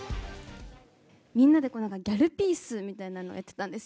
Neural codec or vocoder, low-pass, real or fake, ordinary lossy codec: none; none; real; none